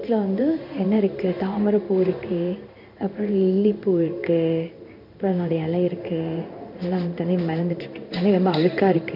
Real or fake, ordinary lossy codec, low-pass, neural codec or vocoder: fake; none; 5.4 kHz; codec, 16 kHz in and 24 kHz out, 1 kbps, XY-Tokenizer